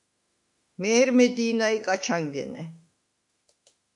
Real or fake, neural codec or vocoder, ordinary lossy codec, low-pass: fake; autoencoder, 48 kHz, 32 numbers a frame, DAC-VAE, trained on Japanese speech; MP3, 64 kbps; 10.8 kHz